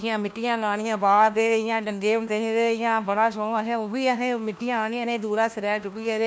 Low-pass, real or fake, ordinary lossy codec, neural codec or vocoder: none; fake; none; codec, 16 kHz, 1 kbps, FunCodec, trained on LibriTTS, 50 frames a second